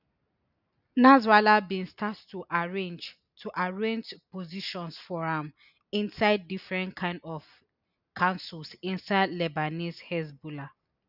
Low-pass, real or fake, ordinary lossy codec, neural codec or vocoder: 5.4 kHz; real; none; none